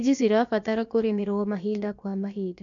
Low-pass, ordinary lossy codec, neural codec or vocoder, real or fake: 7.2 kHz; none; codec, 16 kHz, about 1 kbps, DyCAST, with the encoder's durations; fake